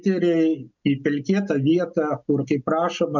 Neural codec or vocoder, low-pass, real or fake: none; 7.2 kHz; real